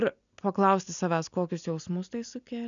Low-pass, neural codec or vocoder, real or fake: 7.2 kHz; none; real